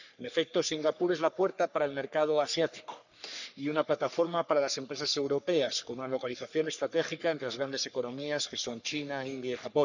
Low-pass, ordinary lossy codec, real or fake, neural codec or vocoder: 7.2 kHz; none; fake; codec, 44.1 kHz, 3.4 kbps, Pupu-Codec